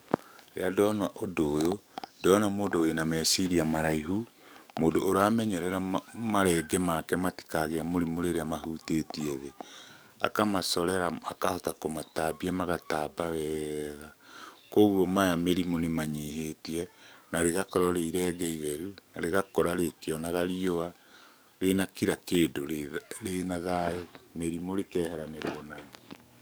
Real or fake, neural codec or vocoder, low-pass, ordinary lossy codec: fake; codec, 44.1 kHz, 7.8 kbps, DAC; none; none